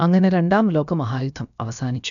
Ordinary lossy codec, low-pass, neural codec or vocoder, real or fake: none; 7.2 kHz; codec, 16 kHz, about 1 kbps, DyCAST, with the encoder's durations; fake